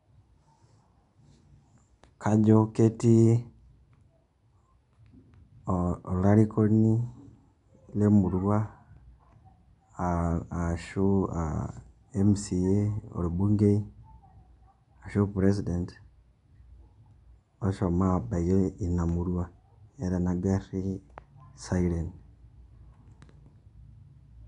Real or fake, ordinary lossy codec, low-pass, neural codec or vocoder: real; none; 10.8 kHz; none